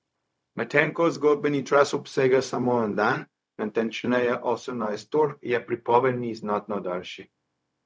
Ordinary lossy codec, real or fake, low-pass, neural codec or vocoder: none; fake; none; codec, 16 kHz, 0.4 kbps, LongCat-Audio-Codec